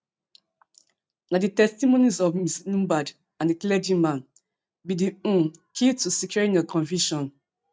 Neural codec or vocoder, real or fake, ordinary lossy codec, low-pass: none; real; none; none